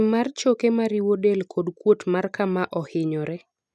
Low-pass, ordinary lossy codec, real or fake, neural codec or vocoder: none; none; real; none